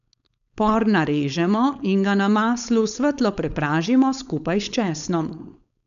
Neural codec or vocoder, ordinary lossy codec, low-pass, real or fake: codec, 16 kHz, 4.8 kbps, FACodec; none; 7.2 kHz; fake